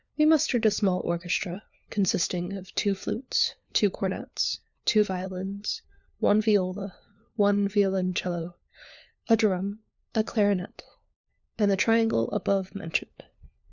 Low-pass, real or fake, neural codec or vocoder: 7.2 kHz; fake; codec, 16 kHz, 4 kbps, FunCodec, trained on LibriTTS, 50 frames a second